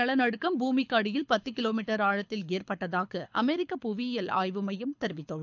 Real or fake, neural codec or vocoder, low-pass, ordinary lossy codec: fake; codec, 24 kHz, 6 kbps, HILCodec; 7.2 kHz; none